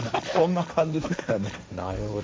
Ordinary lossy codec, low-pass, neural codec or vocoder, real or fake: none; none; codec, 16 kHz, 1.1 kbps, Voila-Tokenizer; fake